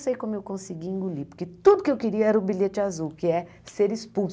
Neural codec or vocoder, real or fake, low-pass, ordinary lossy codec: none; real; none; none